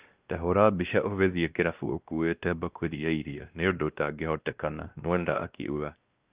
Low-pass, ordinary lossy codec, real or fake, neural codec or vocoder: 3.6 kHz; Opus, 32 kbps; fake; codec, 16 kHz, 1 kbps, X-Codec, WavLM features, trained on Multilingual LibriSpeech